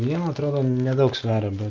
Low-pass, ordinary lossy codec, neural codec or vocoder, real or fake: 7.2 kHz; Opus, 32 kbps; none; real